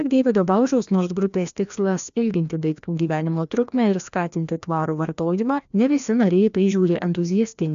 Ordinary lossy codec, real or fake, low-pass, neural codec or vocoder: AAC, 96 kbps; fake; 7.2 kHz; codec, 16 kHz, 1 kbps, FreqCodec, larger model